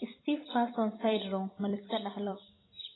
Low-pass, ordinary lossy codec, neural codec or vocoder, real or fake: 7.2 kHz; AAC, 16 kbps; vocoder, 44.1 kHz, 128 mel bands every 256 samples, BigVGAN v2; fake